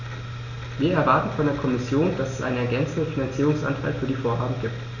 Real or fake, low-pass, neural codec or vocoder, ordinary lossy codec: real; 7.2 kHz; none; none